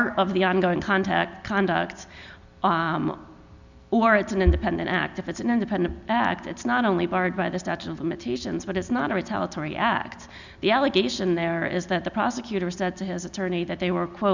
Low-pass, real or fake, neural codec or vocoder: 7.2 kHz; real; none